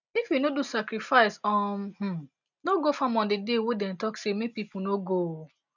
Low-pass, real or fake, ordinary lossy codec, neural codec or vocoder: 7.2 kHz; real; none; none